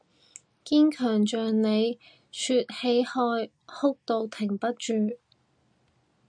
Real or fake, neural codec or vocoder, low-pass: real; none; 9.9 kHz